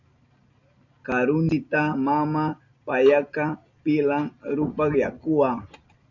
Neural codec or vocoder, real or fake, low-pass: none; real; 7.2 kHz